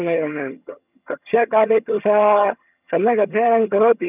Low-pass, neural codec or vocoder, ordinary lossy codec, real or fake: 3.6 kHz; vocoder, 22.05 kHz, 80 mel bands, HiFi-GAN; none; fake